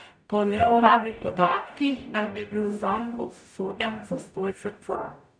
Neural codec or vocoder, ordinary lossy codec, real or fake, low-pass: codec, 44.1 kHz, 0.9 kbps, DAC; none; fake; 9.9 kHz